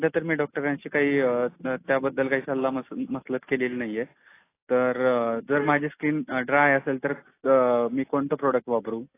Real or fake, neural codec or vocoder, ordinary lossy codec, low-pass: real; none; AAC, 24 kbps; 3.6 kHz